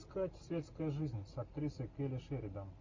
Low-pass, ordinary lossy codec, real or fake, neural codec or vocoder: 7.2 kHz; MP3, 48 kbps; real; none